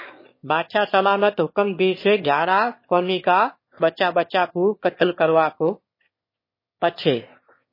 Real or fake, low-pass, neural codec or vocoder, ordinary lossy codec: fake; 5.4 kHz; autoencoder, 22.05 kHz, a latent of 192 numbers a frame, VITS, trained on one speaker; MP3, 24 kbps